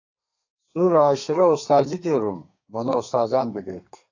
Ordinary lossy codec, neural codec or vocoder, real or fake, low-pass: AAC, 48 kbps; codec, 32 kHz, 1.9 kbps, SNAC; fake; 7.2 kHz